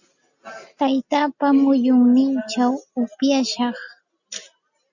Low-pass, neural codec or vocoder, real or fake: 7.2 kHz; none; real